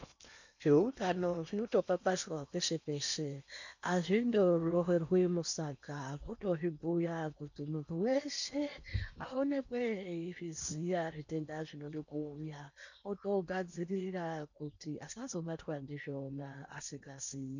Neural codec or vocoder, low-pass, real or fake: codec, 16 kHz in and 24 kHz out, 0.8 kbps, FocalCodec, streaming, 65536 codes; 7.2 kHz; fake